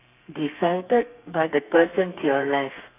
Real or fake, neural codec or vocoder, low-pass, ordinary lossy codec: fake; codec, 32 kHz, 1.9 kbps, SNAC; 3.6 kHz; none